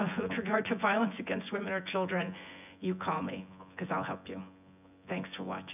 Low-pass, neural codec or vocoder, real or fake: 3.6 kHz; vocoder, 24 kHz, 100 mel bands, Vocos; fake